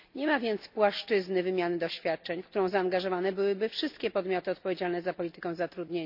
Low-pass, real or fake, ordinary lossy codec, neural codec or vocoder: 5.4 kHz; real; MP3, 48 kbps; none